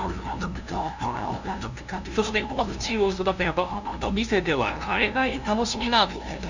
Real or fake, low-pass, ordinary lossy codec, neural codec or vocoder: fake; 7.2 kHz; none; codec, 16 kHz, 0.5 kbps, FunCodec, trained on LibriTTS, 25 frames a second